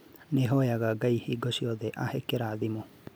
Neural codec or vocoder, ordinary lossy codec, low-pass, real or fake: none; none; none; real